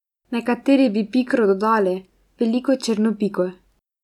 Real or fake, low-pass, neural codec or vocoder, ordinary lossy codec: real; 19.8 kHz; none; none